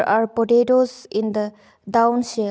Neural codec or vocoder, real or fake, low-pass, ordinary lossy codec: none; real; none; none